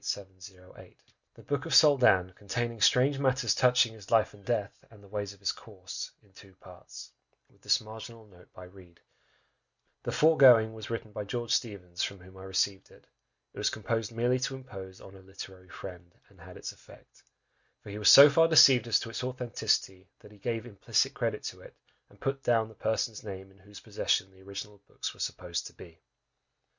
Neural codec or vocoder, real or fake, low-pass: none; real; 7.2 kHz